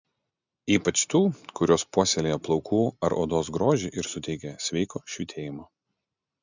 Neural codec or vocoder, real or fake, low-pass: none; real; 7.2 kHz